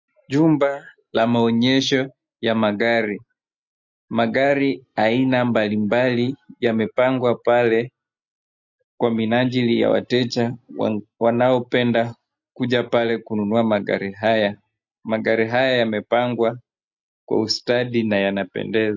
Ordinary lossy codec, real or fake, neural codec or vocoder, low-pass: MP3, 48 kbps; real; none; 7.2 kHz